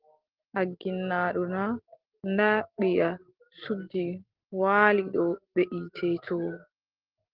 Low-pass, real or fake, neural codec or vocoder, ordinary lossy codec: 5.4 kHz; real; none; Opus, 16 kbps